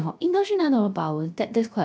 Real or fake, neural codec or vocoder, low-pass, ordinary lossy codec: fake; codec, 16 kHz, 0.3 kbps, FocalCodec; none; none